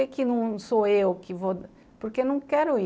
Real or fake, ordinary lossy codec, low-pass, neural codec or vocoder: real; none; none; none